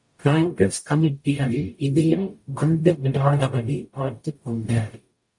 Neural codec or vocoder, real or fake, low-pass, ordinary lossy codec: codec, 44.1 kHz, 0.9 kbps, DAC; fake; 10.8 kHz; MP3, 48 kbps